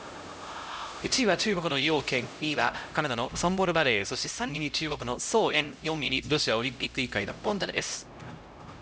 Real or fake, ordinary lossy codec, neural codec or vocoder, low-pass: fake; none; codec, 16 kHz, 0.5 kbps, X-Codec, HuBERT features, trained on LibriSpeech; none